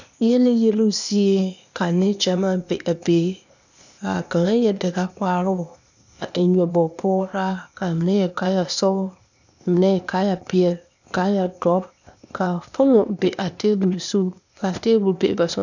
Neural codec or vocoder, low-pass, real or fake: codec, 16 kHz, 0.8 kbps, ZipCodec; 7.2 kHz; fake